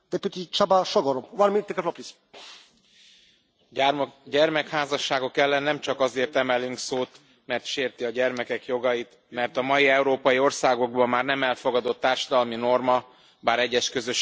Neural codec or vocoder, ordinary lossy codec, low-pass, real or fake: none; none; none; real